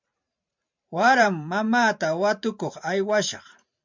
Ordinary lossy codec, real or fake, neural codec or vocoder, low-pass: MP3, 64 kbps; fake; vocoder, 44.1 kHz, 128 mel bands every 512 samples, BigVGAN v2; 7.2 kHz